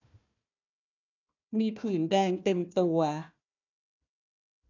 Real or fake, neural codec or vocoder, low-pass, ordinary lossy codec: fake; codec, 16 kHz, 1 kbps, FunCodec, trained on Chinese and English, 50 frames a second; 7.2 kHz; none